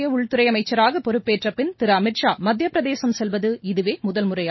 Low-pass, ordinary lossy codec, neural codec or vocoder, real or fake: 7.2 kHz; MP3, 24 kbps; none; real